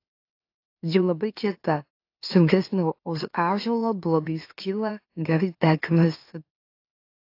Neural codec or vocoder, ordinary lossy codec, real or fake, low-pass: autoencoder, 44.1 kHz, a latent of 192 numbers a frame, MeloTTS; AAC, 32 kbps; fake; 5.4 kHz